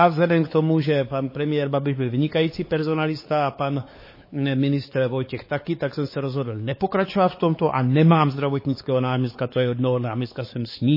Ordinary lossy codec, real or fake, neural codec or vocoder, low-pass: MP3, 24 kbps; fake; codec, 16 kHz, 4 kbps, X-Codec, WavLM features, trained on Multilingual LibriSpeech; 5.4 kHz